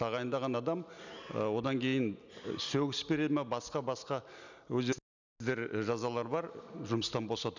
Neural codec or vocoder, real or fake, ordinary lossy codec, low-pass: none; real; none; 7.2 kHz